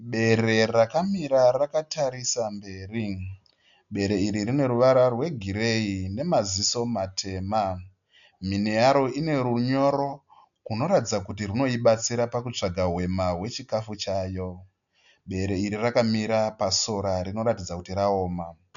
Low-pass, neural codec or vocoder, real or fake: 7.2 kHz; none; real